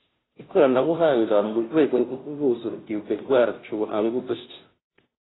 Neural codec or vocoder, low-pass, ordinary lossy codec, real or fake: codec, 16 kHz, 0.5 kbps, FunCodec, trained on Chinese and English, 25 frames a second; 7.2 kHz; AAC, 16 kbps; fake